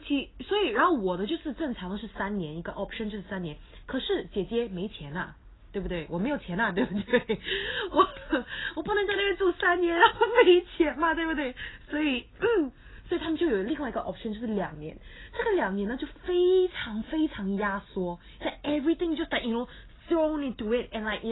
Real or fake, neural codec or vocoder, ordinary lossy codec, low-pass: real; none; AAC, 16 kbps; 7.2 kHz